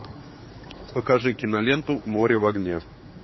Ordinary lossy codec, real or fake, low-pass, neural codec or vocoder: MP3, 24 kbps; fake; 7.2 kHz; codec, 16 kHz, 4 kbps, X-Codec, HuBERT features, trained on general audio